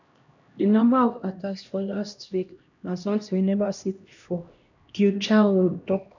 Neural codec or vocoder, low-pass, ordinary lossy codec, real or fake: codec, 16 kHz, 1 kbps, X-Codec, HuBERT features, trained on LibriSpeech; 7.2 kHz; none; fake